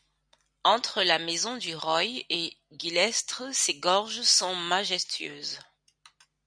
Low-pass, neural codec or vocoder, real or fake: 9.9 kHz; none; real